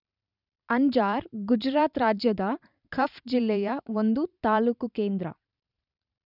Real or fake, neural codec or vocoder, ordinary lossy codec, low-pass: fake; vocoder, 44.1 kHz, 128 mel bands every 512 samples, BigVGAN v2; none; 5.4 kHz